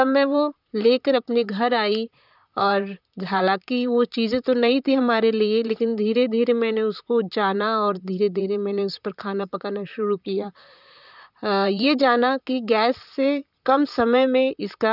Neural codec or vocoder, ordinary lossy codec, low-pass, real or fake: vocoder, 44.1 kHz, 128 mel bands, Pupu-Vocoder; none; 5.4 kHz; fake